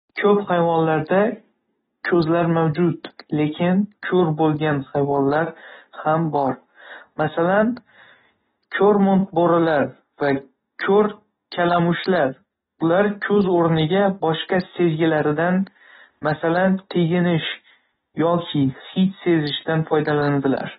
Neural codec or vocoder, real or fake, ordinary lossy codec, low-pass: none; real; AAC, 16 kbps; 19.8 kHz